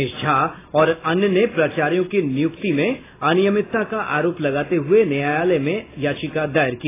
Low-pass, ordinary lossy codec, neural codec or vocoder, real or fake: 3.6 kHz; AAC, 16 kbps; none; real